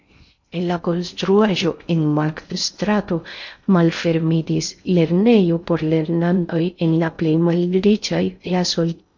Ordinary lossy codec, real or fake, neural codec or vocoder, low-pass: MP3, 48 kbps; fake; codec, 16 kHz in and 24 kHz out, 0.6 kbps, FocalCodec, streaming, 2048 codes; 7.2 kHz